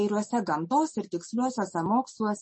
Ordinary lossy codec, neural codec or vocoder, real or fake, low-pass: MP3, 32 kbps; none; real; 10.8 kHz